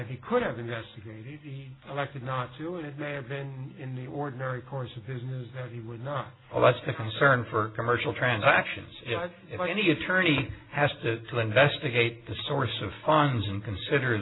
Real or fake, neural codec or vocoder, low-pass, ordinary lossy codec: real; none; 7.2 kHz; AAC, 16 kbps